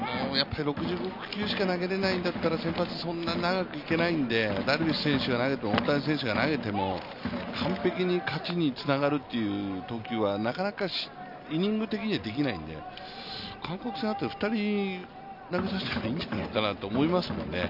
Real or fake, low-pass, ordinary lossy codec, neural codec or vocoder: real; 5.4 kHz; none; none